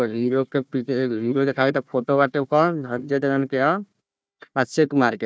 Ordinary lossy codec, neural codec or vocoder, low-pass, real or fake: none; codec, 16 kHz, 1 kbps, FunCodec, trained on Chinese and English, 50 frames a second; none; fake